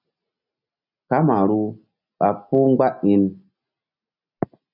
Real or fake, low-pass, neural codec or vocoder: real; 5.4 kHz; none